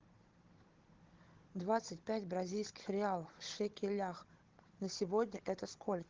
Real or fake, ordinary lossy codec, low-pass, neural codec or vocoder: fake; Opus, 16 kbps; 7.2 kHz; codec, 16 kHz, 16 kbps, FunCodec, trained on Chinese and English, 50 frames a second